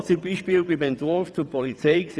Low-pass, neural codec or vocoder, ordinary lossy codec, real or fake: none; vocoder, 22.05 kHz, 80 mel bands, WaveNeXt; none; fake